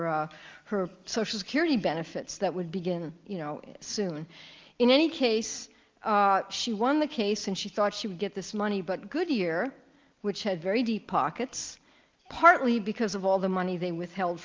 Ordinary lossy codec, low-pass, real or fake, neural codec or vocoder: Opus, 32 kbps; 7.2 kHz; real; none